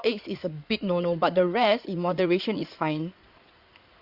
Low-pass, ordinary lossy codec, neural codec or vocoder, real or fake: 5.4 kHz; Opus, 64 kbps; codec, 16 kHz in and 24 kHz out, 2.2 kbps, FireRedTTS-2 codec; fake